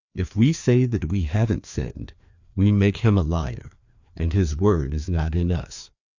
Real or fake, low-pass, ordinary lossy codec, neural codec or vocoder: fake; 7.2 kHz; Opus, 64 kbps; codec, 16 kHz, 2 kbps, FreqCodec, larger model